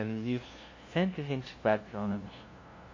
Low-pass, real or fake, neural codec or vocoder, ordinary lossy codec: 7.2 kHz; fake; codec, 16 kHz, 0.5 kbps, FunCodec, trained on LibriTTS, 25 frames a second; MP3, 32 kbps